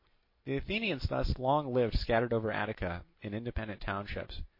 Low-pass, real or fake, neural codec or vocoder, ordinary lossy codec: 5.4 kHz; real; none; MP3, 32 kbps